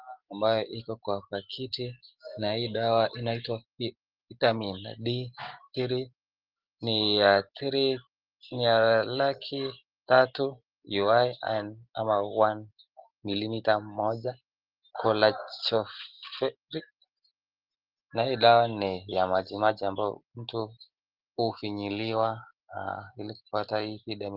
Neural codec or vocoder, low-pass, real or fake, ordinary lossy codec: none; 5.4 kHz; real; Opus, 16 kbps